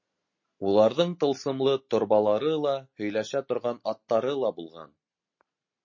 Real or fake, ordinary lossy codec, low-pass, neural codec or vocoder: real; MP3, 32 kbps; 7.2 kHz; none